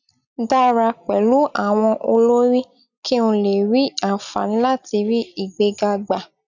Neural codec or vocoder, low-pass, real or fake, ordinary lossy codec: none; 7.2 kHz; real; none